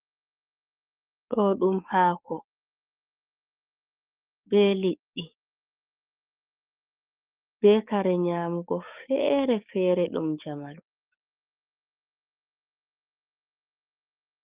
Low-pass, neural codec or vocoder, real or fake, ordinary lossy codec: 3.6 kHz; codec, 44.1 kHz, 7.8 kbps, DAC; fake; Opus, 32 kbps